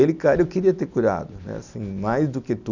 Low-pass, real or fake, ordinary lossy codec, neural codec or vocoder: 7.2 kHz; real; none; none